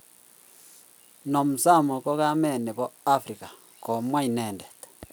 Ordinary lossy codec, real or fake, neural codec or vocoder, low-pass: none; real; none; none